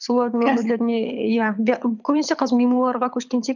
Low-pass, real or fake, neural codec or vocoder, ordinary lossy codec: 7.2 kHz; fake; codec, 24 kHz, 6 kbps, HILCodec; none